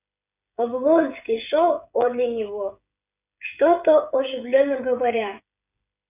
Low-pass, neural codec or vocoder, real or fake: 3.6 kHz; codec, 16 kHz, 16 kbps, FreqCodec, smaller model; fake